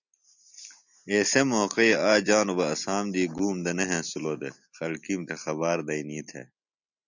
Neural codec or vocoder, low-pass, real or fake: none; 7.2 kHz; real